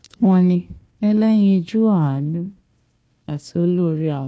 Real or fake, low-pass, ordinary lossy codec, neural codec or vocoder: fake; none; none; codec, 16 kHz, 1 kbps, FunCodec, trained on Chinese and English, 50 frames a second